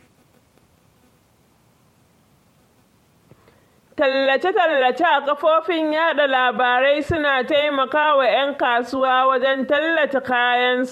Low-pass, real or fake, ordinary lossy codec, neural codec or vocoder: 19.8 kHz; fake; MP3, 64 kbps; vocoder, 44.1 kHz, 128 mel bands every 512 samples, BigVGAN v2